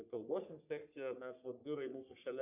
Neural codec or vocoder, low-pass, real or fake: codec, 44.1 kHz, 3.4 kbps, Pupu-Codec; 3.6 kHz; fake